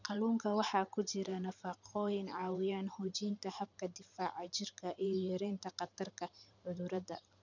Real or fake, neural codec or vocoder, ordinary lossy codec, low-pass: fake; vocoder, 44.1 kHz, 128 mel bands every 512 samples, BigVGAN v2; none; 7.2 kHz